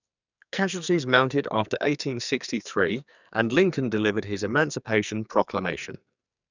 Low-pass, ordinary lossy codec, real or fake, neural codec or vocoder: 7.2 kHz; none; fake; codec, 44.1 kHz, 2.6 kbps, SNAC